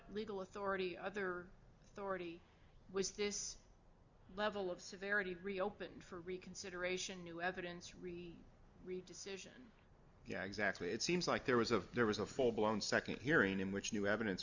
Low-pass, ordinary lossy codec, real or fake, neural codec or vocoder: 7.2 kHz; Opus, 64 kbps; real; none